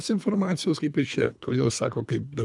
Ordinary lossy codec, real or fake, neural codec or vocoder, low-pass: MP3, 96 kbps; fake; codec, 24 kHz, 3 kbps, HILCodec; 10.8 kHz